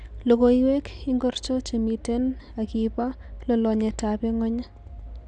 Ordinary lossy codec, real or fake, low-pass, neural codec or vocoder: Opus, 64 kbps; real; 10.8 kHz; none